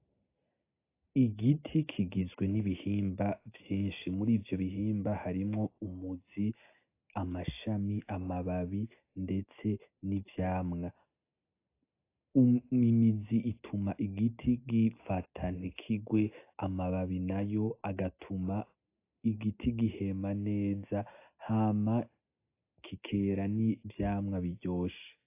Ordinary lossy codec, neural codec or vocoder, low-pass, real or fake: AAC, 24 kbps; none; 3.6 kHz; real